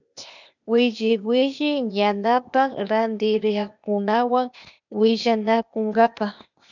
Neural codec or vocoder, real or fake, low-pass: codec, 16 kHz, 0.8 kbps, ZipCodec; fake; 7.2 kHz